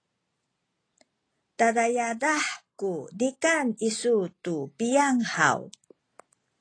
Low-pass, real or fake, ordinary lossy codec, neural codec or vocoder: 9.9 kHz; real; AAC, 32 kbps; none